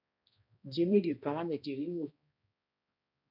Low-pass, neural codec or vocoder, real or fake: 5.4 kHz; codec, 16 kHz, 1 kbps, X-Codec, HuBERT features, trained on balanced general audio; fake